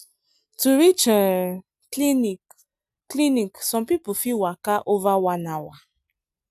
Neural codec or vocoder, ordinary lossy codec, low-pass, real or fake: none; none; 14.4 kHz; real